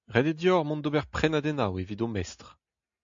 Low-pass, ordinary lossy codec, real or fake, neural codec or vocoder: 7.2 kHz; AAC, 64 kbps; real; none